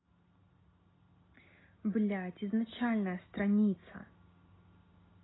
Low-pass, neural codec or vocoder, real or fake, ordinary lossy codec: 7.2 kHz; none; real; AAC, 16 kbps